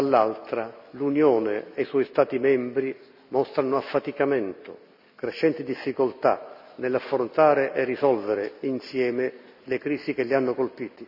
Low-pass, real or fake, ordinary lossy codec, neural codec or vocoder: 5.4 kHz; real; none; none